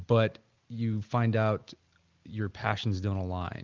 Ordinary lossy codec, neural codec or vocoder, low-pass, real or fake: Opus, 24 kbps; none; 7.2 kHz; real